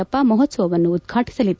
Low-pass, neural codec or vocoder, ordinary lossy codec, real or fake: none; none; none; real